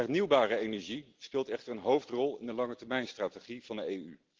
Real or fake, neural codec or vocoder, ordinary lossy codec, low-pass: real; none; Opus, 16 kbps; 7.2 kHz